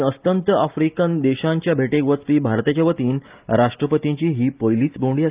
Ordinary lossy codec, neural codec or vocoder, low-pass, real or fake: Opus, 32 kbps; none; 3.6 kHz; real